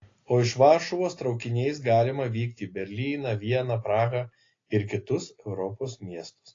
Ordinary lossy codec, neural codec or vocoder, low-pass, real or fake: AAC, 32 kbps; none; 7.2 kHz; real